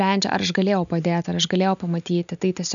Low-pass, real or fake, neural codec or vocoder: 7.2 kHz; real; none